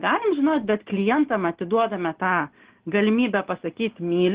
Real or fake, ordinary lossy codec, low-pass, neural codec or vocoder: real; Opus, 16 kbps; 3.6 kHz; none